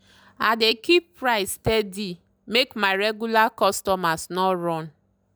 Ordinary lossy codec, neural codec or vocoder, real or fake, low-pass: none; none; real; none